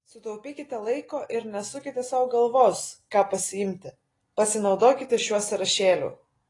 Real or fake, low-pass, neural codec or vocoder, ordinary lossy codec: real; 10.8 kHz; none; AAC, 32 kbps